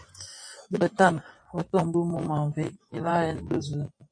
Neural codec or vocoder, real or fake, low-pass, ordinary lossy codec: vocoder, 24 kHz, 100 mel bands, Vocos; fake; 9.9 kHz; MP3, 48 kbps